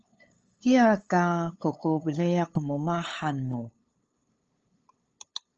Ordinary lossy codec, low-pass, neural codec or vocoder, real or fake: Opus, 24 kbps; 7.2 kHz; codec, 16 kHz, 8 kbps, FunCodec, trained on LibriTTS, 25 frames a second; fake